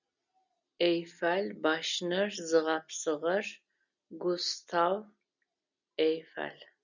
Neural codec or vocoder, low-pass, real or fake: none; 7.2 kHz; real